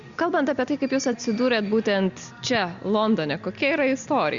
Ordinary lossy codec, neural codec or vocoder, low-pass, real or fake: Opus, 64 kbps; none; 7.2 kHz; real